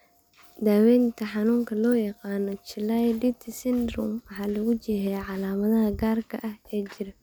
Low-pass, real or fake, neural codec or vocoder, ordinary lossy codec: none; real; none; none